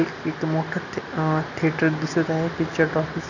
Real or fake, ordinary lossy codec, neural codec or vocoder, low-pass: real; none; none; 7.2 kHz